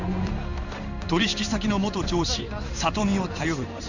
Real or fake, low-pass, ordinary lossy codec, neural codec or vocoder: fake; 7.2 kHz; none; codec, 16 kHz in and 24 kHz out, 1 kbps, XY-Tokenizer